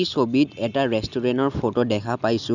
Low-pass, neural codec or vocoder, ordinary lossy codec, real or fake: 7.2 kHz; none; none; real